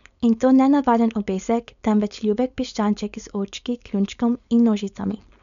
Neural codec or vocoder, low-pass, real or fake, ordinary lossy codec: codec, 16 kHz, 4.8 kbps, FACodec; 7.2 kHz; fake; none